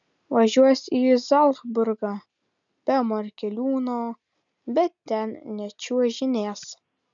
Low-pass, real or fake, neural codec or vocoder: 7.2 kHz; real; none